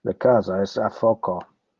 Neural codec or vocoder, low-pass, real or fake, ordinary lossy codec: none; 7.2 kHz; real; Opus, 32 kbps